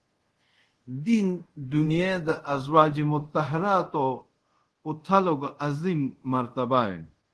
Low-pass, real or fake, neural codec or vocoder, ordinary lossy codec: 10.8 kHz; fake; codec, 24 kHz, 0.5 kbps, DualCodec; Opus, 16 kbps